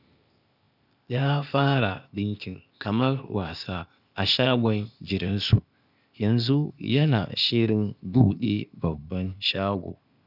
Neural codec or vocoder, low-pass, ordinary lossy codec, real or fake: codec, 16 kHz, 0.8 kbps, ZipCodec; 5.4 kHz; none; fake